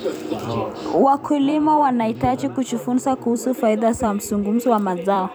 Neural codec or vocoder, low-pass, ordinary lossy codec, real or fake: vocoder, 44.1 kHz, 128 mel bands every 256 samples, BigVGAN v2; none; none; fake